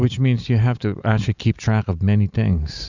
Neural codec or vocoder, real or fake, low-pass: none; real; 7.2 kHz